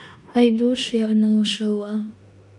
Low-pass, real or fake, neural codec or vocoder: 10.8 kHz; fake; codec, 16 kHz in and 24 kHz out, 0.9 kbps, LongCat-Audio-Codec, four codebook decoder